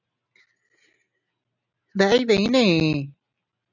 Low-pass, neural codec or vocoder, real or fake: 7.2 kHz; none; real